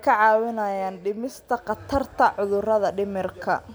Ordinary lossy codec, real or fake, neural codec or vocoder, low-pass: none; real; none; none